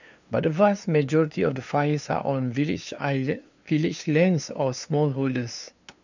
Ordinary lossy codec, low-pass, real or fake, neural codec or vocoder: none; 7.2 kHz; fake; codec, 16 kHz, 2 kbps, FunCodec, trained on LibriTTS, 25 frames a second